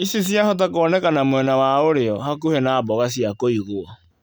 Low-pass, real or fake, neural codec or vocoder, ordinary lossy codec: none; real; none; none